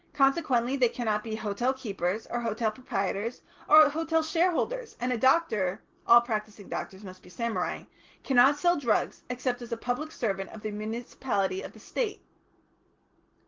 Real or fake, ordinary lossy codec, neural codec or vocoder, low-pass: real; Opus, 16 kbps; none; 7.2 kHz